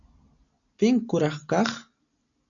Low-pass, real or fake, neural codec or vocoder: 7.2 kHz; real; none